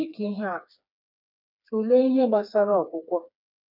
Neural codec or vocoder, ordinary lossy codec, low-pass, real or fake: codec, 16 kHz, 4 kbps, FreqCodec, smaller model; none; 5.4 kHz; fake